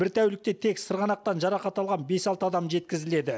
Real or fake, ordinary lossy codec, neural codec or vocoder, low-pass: real; none; none; none